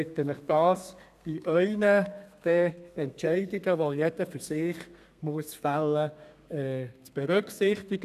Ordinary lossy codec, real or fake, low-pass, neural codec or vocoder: none; fake; 14.4 kHz; codec, 32 kHz, 1.9 kbps, SNAC